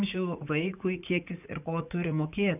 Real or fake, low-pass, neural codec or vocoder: fake; 3.6 kHz; vocoder, 44.1 kHz, 80 mel bands, Vocos